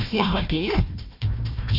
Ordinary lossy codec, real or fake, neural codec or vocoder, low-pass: none; fake; codec, 16 kHz, 1 kbps, FunCodec, trained on Chinese and English, 50 frames a second; 5.4 kHz